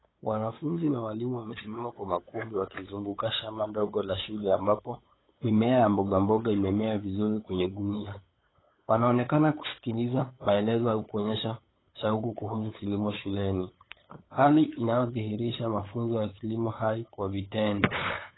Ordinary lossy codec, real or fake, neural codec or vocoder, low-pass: AAC, 16 kbps; fake; codec, 16 kHz, 8 kbps, FunCodec, trained on LibriTTS, 25 frames a second; 7.2 kHz